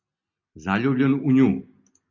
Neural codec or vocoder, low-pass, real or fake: none; 7.2 kHz; real